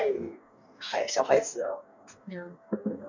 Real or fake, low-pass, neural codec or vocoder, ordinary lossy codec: fake; 7.2 kHz; codec, 44.1 kHz, 2.6 kbps, DAC; none